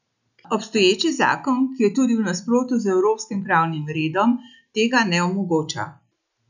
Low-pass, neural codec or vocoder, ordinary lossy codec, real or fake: 7.2 kHz; none; none; real